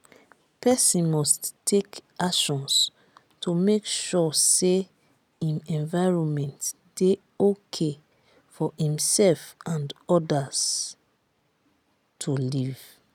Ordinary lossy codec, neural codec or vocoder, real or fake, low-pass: none; none; real; 19.8 kHz